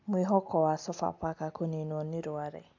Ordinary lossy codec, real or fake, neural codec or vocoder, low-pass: AAC, 48 kbps; real; none; 7.2 kHz